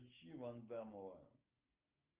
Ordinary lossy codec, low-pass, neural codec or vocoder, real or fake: Opus, 32 kbps; 3.6 kHz; none; real